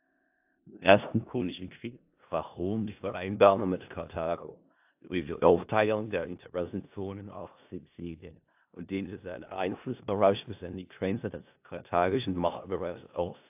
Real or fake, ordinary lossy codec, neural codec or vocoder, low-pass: fake; AAC, 32 kbps; codec, 16 kHz in and 24 kHz out, 0.4 kbps, LongCat-Audio-Codec, four codebook decoder; 3.6 kHz